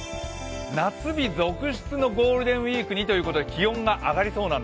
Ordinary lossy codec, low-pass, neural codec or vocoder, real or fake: none; none; none; real